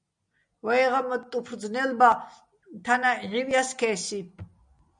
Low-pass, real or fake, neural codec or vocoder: 9.9 kHz; real; none